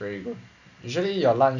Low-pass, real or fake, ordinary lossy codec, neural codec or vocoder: 7.2 kHz; real; none; none